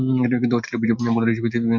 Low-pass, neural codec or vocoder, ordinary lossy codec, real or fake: 7.2 kHz; none; MP3, 48 kbps; real